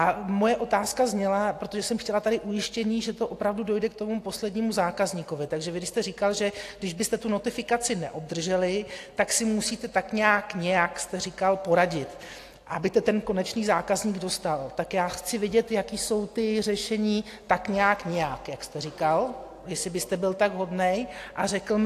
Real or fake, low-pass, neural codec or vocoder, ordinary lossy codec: real; 14.4 kHz; none; AAC, 64 kbps